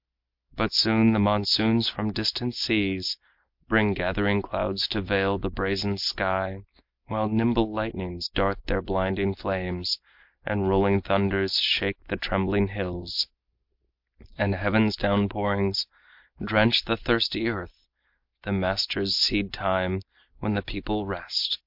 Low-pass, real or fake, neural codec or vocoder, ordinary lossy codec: 5.4 kHz; real; none; MP3, 48 kbps